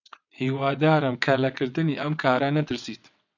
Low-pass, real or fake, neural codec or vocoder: 7.2 kHz; fake; vocoder, 22.05 kHz, 80 mel bands, WaveNeXt